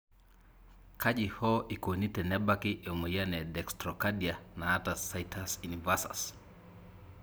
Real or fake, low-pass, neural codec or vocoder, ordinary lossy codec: real; none; none; none